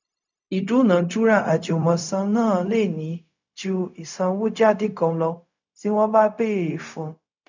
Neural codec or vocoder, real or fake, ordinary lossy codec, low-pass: codec, 16 kHz, 0.4 kbps, LongCat-Audio-Codec; fake; none; 7.2 kHz